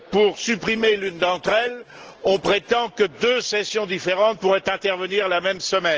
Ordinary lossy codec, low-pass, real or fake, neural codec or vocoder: Opus, 16 kbps; 7.2 kHz; real; none